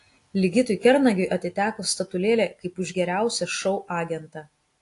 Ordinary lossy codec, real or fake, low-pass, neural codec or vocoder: AAC, 48 kbps; real; 10.8 kHz; none